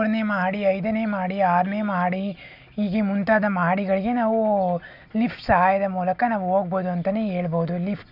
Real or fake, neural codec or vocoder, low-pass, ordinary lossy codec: real; none; 5.4 kHz; none